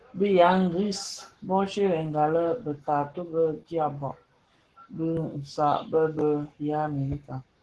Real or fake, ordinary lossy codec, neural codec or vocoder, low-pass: fake; Opus, 16 kbps; codec, 44.1 kHz, 7.8 kbps, Pupu-Codec; 10.8 kHz